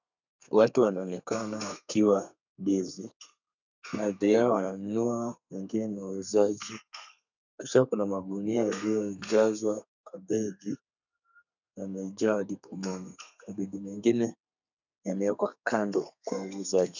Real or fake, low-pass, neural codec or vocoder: fake; 7.2 kHz; codec, 32 kHz, 1.9 kbps, SNAC